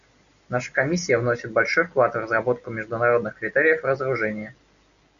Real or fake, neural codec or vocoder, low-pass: real; none; 7.2 kHz